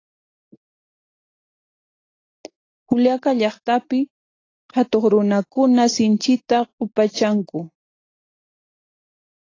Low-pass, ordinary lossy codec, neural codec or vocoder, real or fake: 7.2 kHz; AAC, 32 kbps; none; real